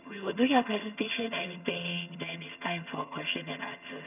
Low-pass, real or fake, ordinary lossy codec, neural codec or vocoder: 3.6 kHz; fake; none; vocoder, 22.05 kHz, 80 mel bands, HiFi-GAN